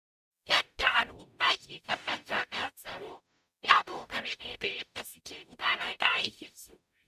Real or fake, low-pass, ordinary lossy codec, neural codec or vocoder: fake; 14.4 kHz; none; codec, 44.1 kHz, 0.9 kbps, DAC